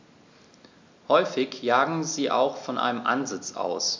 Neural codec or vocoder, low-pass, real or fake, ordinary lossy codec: none; 7.2 kHz; real; MP3, 64 kbps